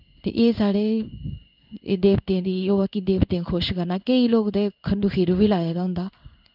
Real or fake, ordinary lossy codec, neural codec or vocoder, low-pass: fake; none; codec, 16 kHz in and 24 kHz out, 1 kbps, XY-Tokenizer; 5.4 kHz